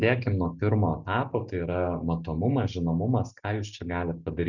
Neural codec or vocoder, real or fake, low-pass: none; real; 7.2 kHz